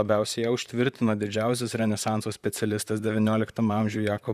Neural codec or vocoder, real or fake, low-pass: vocoder, 44.1 kHz, 128 mel bands, Pupu-Vocoder; fake; 14.4 kHz